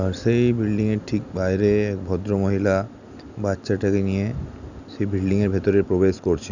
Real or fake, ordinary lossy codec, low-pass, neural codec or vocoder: real; none; 7.2 kHz; none